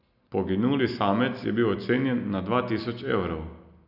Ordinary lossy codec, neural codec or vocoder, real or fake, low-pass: none; none; real; 5.4 kHz